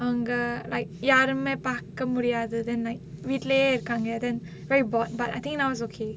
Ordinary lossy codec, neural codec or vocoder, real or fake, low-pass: none; none; real; none